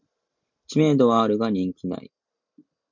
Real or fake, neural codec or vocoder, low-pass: real; none; 7.2 kHz